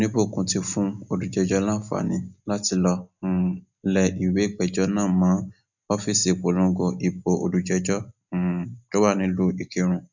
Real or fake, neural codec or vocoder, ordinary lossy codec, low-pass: real; none; none; 7.2 kHz